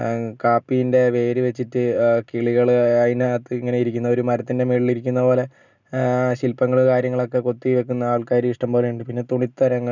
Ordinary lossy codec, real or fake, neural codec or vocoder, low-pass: none; real; none; 7.2 kHz